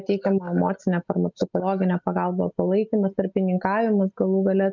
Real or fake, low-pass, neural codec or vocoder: real; 7.2 kHz; none